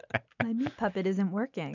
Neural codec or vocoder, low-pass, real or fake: none; 7.2 kHz; real